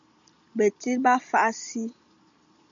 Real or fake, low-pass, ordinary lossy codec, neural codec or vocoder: real; 7.2 kHz; MP3, 64 kbps; none